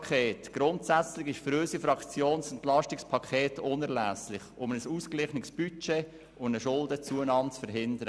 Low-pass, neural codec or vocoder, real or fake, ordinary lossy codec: none; none; real; none